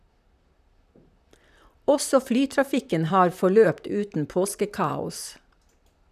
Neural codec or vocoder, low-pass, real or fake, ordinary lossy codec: vocoder, 44.1 kHz, 128 mel bands, Pupu-Vocoder; 14.4 kHz; fake; none